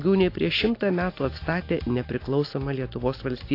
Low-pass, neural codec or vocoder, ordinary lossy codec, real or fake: 5.4 kHz; none; AAC, 32 kbps; real